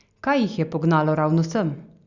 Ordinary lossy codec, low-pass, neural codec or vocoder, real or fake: Opus, 64 kbps; 7.2 kHz; none; real